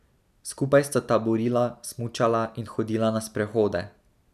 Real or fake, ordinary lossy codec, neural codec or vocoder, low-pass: real; none; none; 14.4 kHz